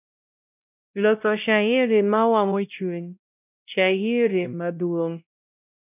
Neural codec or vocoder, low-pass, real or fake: codec, 16 kHz, 0.5 kbps, X-Codec, WavLM features, trained on Multilingual LibriSpeech; 3.6 kHz; fake